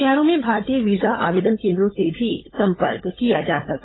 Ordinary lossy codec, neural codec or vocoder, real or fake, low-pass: AAC, 16 kbps; codec, 16 kHz, 16 kbps, FunCodec, trained on LibriTTS, 50 frames a second; fake; 7.2 kHz